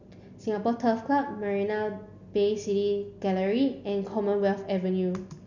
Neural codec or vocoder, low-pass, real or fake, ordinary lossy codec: none; 7.2 kHz; real; none